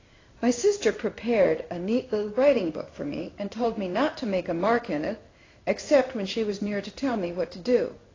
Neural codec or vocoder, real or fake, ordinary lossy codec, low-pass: codec, 16 kHz in and 24 kHz out, 1 kbps, XY-Tokenizer; fake; AAC, 32 kbps; 7.2 kHz